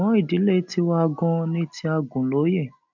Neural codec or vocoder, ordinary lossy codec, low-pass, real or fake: none; none; 7.2 kHz; real